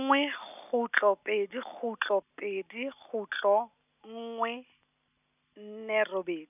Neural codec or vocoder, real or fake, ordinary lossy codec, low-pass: none; real; none; 3.6 kHz